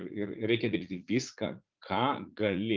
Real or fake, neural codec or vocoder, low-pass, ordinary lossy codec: fake; vocoder, 44.1 kHz, 80 mel bands, Vocos; 7.2 kHz; Opus, 24 kbps